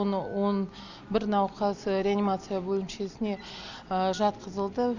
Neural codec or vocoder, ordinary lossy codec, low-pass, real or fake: none; none; 7.2 kHz; real